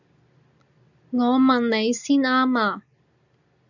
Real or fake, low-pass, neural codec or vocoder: real; 7.2 kHz; none